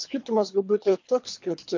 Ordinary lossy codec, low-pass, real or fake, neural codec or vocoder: MP3, 48 kbps; 7.2 kHz; fake; codec, 24 kHz, 3 kbps, HILCodec